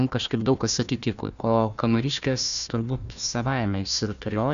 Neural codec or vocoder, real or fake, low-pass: codec, 16 kHz, 1 kbps, FunCodec, trained on Chinese and English, 50 frames a second; fake; 7.2 kHz